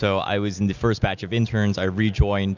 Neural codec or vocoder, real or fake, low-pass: none; real; 7.2 kHz